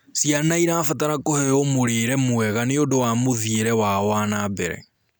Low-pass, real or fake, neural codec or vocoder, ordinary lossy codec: none; real; none; none